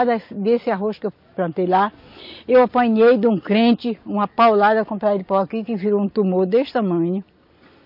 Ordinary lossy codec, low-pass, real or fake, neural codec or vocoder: MP3, 32 kbps; 5.4 kHz; real; none